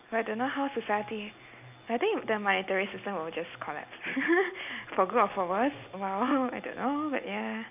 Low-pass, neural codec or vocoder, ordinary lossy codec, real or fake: 3.6 kHz; none; none; real